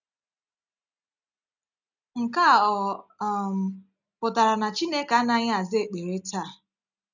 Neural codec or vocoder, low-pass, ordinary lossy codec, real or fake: none; 7.2 kHz; none; real